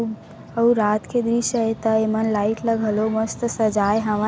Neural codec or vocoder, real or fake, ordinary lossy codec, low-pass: none; real; none; none